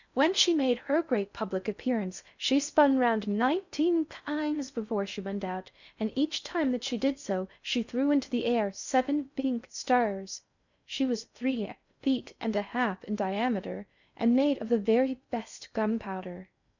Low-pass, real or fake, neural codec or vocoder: 7.2 kHz; fake; codec, 16 kHz in and 24 kHz out, 0.6 kbps, FocalCodec, streaming, 4096 codes